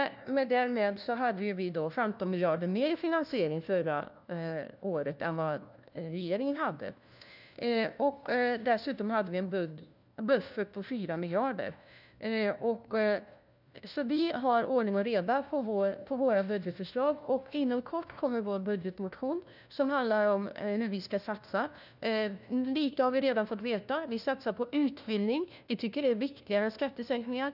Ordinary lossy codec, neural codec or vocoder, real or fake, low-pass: none; codec, 16 kHz, 1 kbps, FunCodec, trained on LibriTTS, 50 frames a second; fake; 5.4 kHz